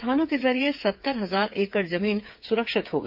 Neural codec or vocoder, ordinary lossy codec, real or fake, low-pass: codec, 16 kHz, 8 kbps, FreqCodec, smaller model; MP3, 48 kbps; fake; 5.4 kHz